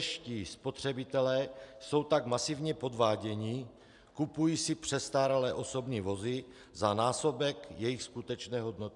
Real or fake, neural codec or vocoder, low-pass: real; none; 10.8 kHz